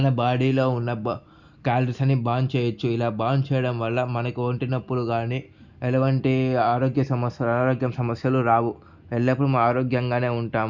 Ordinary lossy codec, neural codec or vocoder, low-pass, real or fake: none; none; 7.2 kHz; real